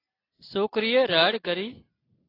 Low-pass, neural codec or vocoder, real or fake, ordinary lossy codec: 5.4 kHz; none; real; AAC, 24 kbps